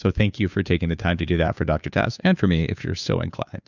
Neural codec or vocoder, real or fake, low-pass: codec, 16 kHz, 2 kbps, FunCodec, trained on Chinese and English, 25 frames a second; fake; 7.2 kHz